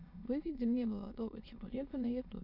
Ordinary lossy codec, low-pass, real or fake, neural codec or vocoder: AAC, 32 kbps; 5.4 kHz; fake; autoencoder, 22.05 kHz, a latent of 192 numbers a frame, VITS, trained on many speakers